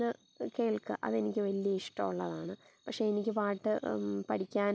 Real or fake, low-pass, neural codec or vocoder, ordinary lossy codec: real; none; none; none